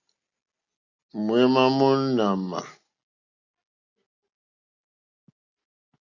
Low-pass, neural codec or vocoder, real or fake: 7.2 kHz; none; real